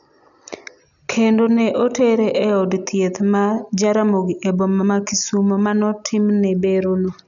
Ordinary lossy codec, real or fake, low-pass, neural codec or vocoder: none; real; 7.2 kHz; none